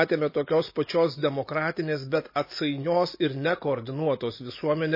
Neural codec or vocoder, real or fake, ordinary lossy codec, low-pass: none; real; MP3, 24 kbps; 5.4 kHz